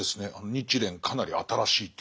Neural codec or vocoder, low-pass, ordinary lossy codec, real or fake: none; none; none; real